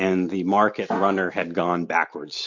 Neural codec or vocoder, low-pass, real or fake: none; 7.2 kHz; real